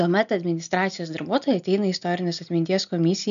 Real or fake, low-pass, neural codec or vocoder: real; 7.2 kHz; none